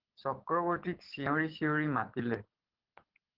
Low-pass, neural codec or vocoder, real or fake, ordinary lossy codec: 5.4 kHz; codec, 24 kHz, 6 kbps, HILCodec; fake; Opus, 16 kbps